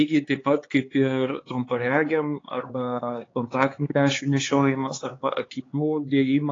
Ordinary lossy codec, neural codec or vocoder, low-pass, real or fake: AAC, 32 kbps; codec, 16 kHz, 4 kbps, X-Codec, HuBERT features, trained on LibriSpeech; 7.2 kHz; fake